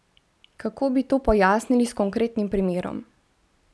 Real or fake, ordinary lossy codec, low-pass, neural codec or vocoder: real; none; none; none